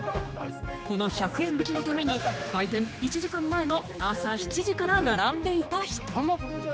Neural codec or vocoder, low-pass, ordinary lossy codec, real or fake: codec, 16 kHz, 2 kbps, X-Codec, HuBERT features, trained on general audio; none; none; fake